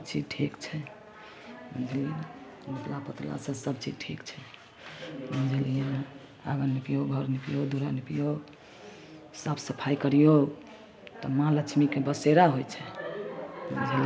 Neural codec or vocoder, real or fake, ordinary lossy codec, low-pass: none; real; none; none